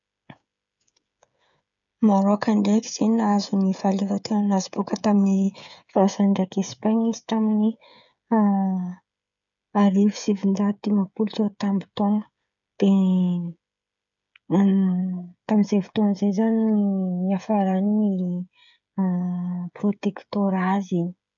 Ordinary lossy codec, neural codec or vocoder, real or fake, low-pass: none; codec, 16 kHz, 16 kbps, FreqCodec, smaller model; fake; 7.2 kHz